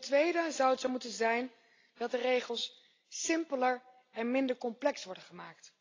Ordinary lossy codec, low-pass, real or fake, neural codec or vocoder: AAC, 32 kbps; 7.2 kHz; real; none